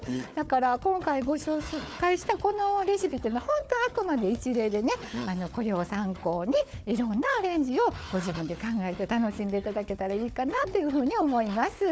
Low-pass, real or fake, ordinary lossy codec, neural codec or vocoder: none; fake; none; codec, 16 kHz, 4 kbps, FunCodec, trained on Chinese and English, 50 frames a second